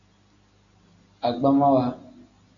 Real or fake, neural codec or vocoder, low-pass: real; none; 7.2 kHz